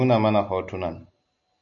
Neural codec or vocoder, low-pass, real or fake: none; 7.2 kHz; real